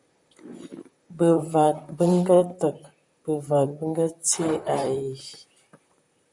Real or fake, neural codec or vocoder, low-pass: fake; vocoder, 44.1 kHz, 128 mel bands, Pupu-Vocoder; 10.8 kHz